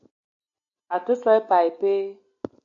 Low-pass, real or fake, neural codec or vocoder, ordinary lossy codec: 7.2 kHz; real; none; AAC, 48 kbps